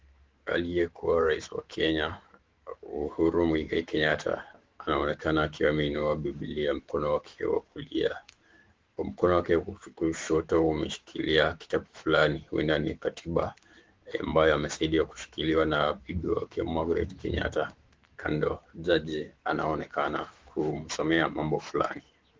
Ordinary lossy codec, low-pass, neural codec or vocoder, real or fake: Opus, 16 kbps; 7.2 kHz; autoencoder, 48 kHz, 128 numbers a frame, DAC-VAE, trained on Japanese speech; fake